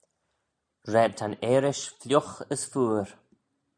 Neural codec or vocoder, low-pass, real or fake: none; 9.9 kHz; real